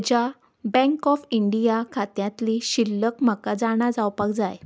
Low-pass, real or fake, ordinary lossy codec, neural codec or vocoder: none; real; none; none